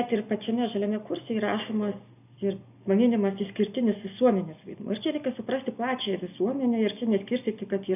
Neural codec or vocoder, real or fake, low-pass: none; real; 3.6 kHz